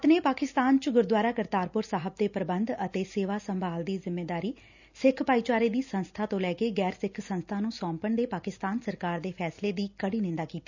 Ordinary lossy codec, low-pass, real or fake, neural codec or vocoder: none; 7.2 kHz; real; none